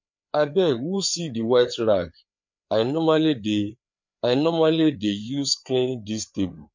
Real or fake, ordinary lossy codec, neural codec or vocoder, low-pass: fake; MP3, 48 kbps; codec, 16 kHz, 4 kbps, FreqCodec, larger model; 7.2 kHz